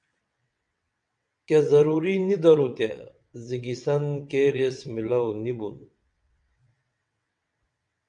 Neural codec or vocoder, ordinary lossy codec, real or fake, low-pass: vocoder, 22.05 kHz, 80 mel bands, WaveNeXt; MP3, 96 kbps; fake; 9.9 kHz